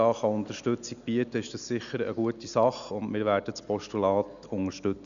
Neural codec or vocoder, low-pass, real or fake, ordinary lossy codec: none; 7.2 kHz; real; none